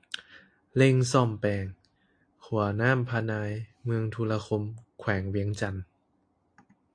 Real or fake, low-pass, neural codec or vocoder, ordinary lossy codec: real; 9.9 kHz; none; AAC, 48 kbps